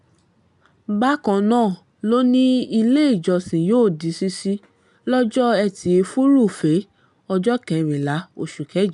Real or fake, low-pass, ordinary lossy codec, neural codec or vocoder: real; 10.8 kHz; none; none